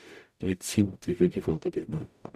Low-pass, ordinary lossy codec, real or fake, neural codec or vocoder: 14.4 kHz; none; fake; codec, 44.1 kHz, 0.9 kbps, DAC